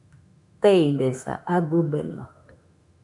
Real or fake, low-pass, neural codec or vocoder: fake; 10.8 kHz; autoencoder, 48 kHz, 32 numbers a frame, DAC-VAE, trained on Japanese speech